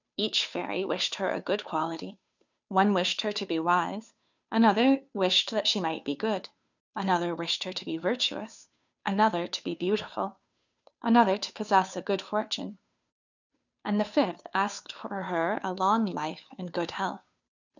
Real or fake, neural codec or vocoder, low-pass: fake; codec, 16 kHz, 2 kbps, FunCodec, trained on Chinese and English, 25 frames a second; 7.2 kHz